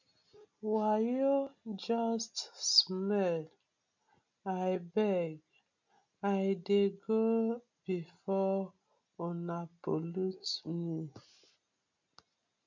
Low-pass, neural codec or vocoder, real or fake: 7.2 kHz; none; real